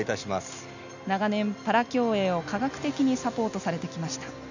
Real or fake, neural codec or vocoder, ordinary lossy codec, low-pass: real; none; none; 7.2 kHz